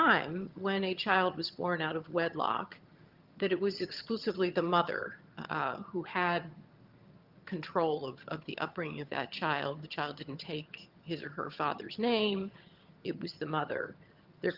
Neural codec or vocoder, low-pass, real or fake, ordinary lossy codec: vocoder, 22.05 kHz, 80 mel bands, HiFi-GAN; 5.4 kHz; fake; Opus, 32 kbps